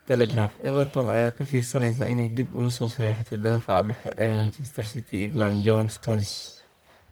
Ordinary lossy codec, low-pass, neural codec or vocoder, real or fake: none; none; codec, 44.1 kHz, 1.7 kbps, Pupu-Codec; fake